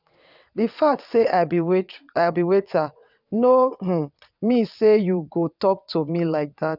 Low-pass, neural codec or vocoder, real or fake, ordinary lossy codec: 5.4 kHz; vocoder, 22.05 kHz, 80 mel bands, WaveNeXt; fake; none